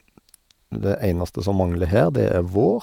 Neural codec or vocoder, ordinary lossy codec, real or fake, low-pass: vocoder, 48 kHz, 128 mel bands, Vocos; none; fake; 19.8 kHz